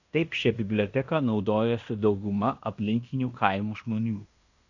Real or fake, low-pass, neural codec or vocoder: fake; 7.2 kHz; codec, 16 kHz in and 24 kHz out, 0.9 kbps, LongCat-Audio-Codec, fine tuned four codebook decoder